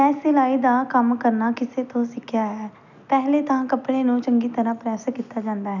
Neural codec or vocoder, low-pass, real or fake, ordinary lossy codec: none; 7.2 kHz; real; none